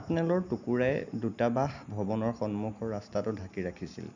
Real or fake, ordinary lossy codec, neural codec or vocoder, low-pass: real; none; none; 7.2 kHz